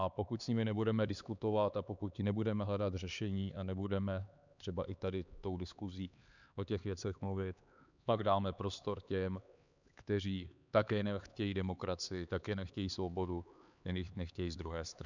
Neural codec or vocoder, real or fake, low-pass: codec, 16 kHz, 4 kbps, X-Codec, HuBERT features, trained on LibriSpeech; fake; 7.2 kHz